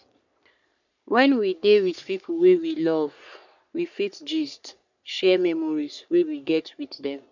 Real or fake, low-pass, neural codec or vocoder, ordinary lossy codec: fake; 7.2 kHz; codec, 44.1 kHz, 3.4 kbps, Pupu-Codec; none